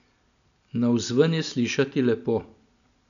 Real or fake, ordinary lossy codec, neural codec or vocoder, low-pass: real; none; none; 7.2 kHz